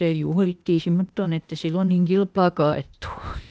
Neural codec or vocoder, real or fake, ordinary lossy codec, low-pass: codec, 16 kHz, 0.8 kbps, ZipCodec; fake; none; none